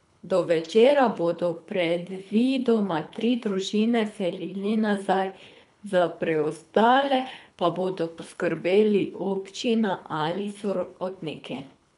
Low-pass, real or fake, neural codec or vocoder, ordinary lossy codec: 10.8 kHz; fake; codec, 24 kHz, 3 kbps, HILCodec; none